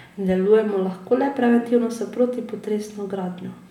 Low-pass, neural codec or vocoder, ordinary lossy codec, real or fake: 19.8 kHz; none; none; real